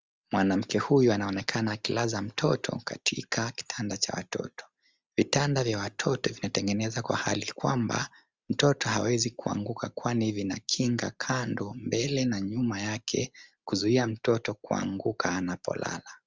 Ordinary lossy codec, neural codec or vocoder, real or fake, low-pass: Opus, 32 kbps; none; real; 7.2 kHz